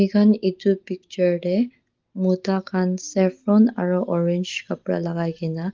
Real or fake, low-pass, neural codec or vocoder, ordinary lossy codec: real; 7.2 kHz; none; Opus, 16 kbps